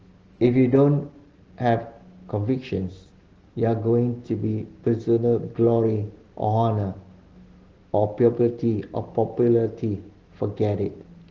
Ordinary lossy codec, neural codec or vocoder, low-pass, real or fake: Opus, 16 kbps; none; 7.2 kHz; real